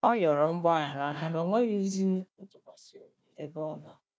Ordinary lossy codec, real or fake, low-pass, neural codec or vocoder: none; fake; none; codec, 16 kHz, 1 kbps, FunCodec, trained on Chinese and English, 50 frames a second